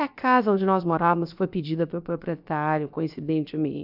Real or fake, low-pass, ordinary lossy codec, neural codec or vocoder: fake; 5.4 kHz; none; codec, 16 kHz, about 1 kbps, DyCAST, with the encoder's durations